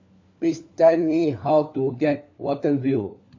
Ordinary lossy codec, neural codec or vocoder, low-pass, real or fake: AAC, 48 kbps; codec, 16 kHz, 4 kbps, FunCodec, trained on LibriTTS, 50 frames a second; 7.2 kHz; fake